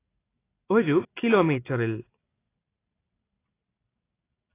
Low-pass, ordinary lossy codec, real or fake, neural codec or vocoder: 3.6 kHz; AAC, 24 kbps; real; none